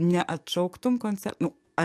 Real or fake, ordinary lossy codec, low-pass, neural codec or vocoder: fake; AAC, 96 kbps; 14.4 kHz; codec, 44.1 kHz, 7.8 kbps, DAC